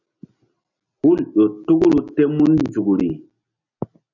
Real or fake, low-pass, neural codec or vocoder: real; 7.2 kHz; none